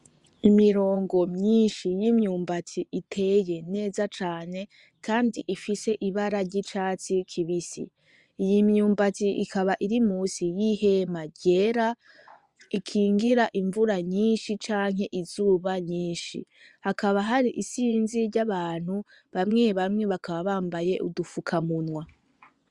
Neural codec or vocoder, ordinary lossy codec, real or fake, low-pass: vocoder, 24 kHz, 100 mel bands, Vocos; Opus, 64 kbps; fake; 10.8 kHz